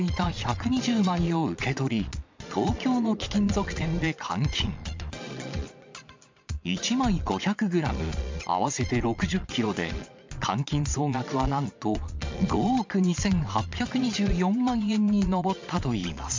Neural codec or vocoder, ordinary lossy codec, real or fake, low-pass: vocoder, 22.05 kHz, 80 mel bands, Vocos; MP3, 64 kbps; fake; 7.2 kHz